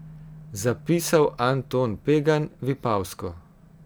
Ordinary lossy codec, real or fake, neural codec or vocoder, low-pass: none; real; none; none